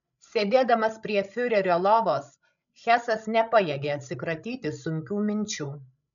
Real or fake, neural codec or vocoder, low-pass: fake; codec, 16 kHz, 16 kbps, FreqCodec, larger model; 7.2 kHz